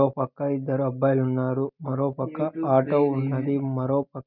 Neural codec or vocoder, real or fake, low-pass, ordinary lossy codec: none; real; 5.4 kHz; none